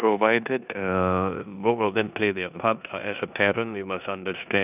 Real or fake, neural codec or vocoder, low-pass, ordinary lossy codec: fake; codec, 16 kHz in and 24 kHz out, 0.9 kbps, LongCat-Audio-Codec, four codebook decoder; 3.6 kHz; none